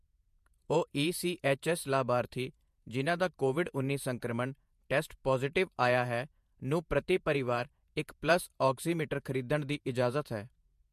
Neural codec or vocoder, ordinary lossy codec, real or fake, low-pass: vocoder, 48 kHz, 128 mel bands, Vocos; MP3, 64 kbps; fake; 14.4 kHz